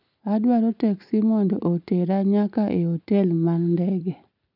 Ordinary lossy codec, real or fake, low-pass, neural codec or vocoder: none; real; 5.4 kHz; none